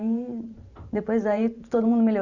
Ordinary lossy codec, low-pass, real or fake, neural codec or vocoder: Opus, 64 kbps; 7.2 kHz; fake; vocoder, 44.1 kHz, 128 mel bands every 512 samples, BigVGAN v2